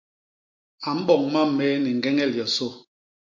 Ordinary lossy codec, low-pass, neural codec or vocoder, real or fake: MP3, 48 kbps; 7.2 kHz; none; real